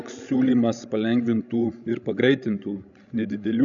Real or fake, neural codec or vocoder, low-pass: fake; codec, 16 kHz, 16 kbps, FreqCodec, larger model; 7.2 kHz